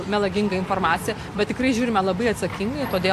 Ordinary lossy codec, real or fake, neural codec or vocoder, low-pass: AAC, 64 kbps; real; none; 14.4 kHz